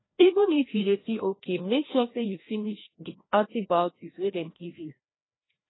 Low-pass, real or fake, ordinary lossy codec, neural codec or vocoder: 7.2 kHz; fake; AAC, 16 kbps; codec, 16 kHz, 1 kbps, FreqCodec, larger model